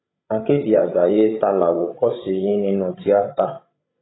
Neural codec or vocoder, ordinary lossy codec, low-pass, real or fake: codec, 16 kHz, 16 kbps, FreqCodec, larger model; AAC, 16 kbps; 7.2 kHz; fake